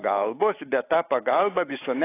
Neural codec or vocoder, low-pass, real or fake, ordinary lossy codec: none; 3.6 kHz; real; AAC, 24 kbps